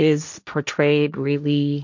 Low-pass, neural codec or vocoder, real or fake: 7.2 kHz; codec, 16 kHz, 1.1 kbps, Voila-Tokenizer; fake